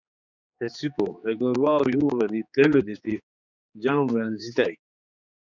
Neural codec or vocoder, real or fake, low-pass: codec, 16 kHz, 4 kbps, X-Codec, HuBERT features, trained on general audio; fake; 7.2 kHz